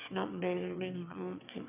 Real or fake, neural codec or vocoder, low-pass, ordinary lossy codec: fake; autoencoder, 22.05 kHz, a latent of 192 numbers a frame, VITS, trained on one speaker; 3.6 kHz; none